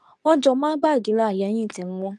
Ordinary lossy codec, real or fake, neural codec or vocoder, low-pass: none; fake; codec, 24 kHz, 0.9 kbps, WavTokenizer, medium speech release version 2; none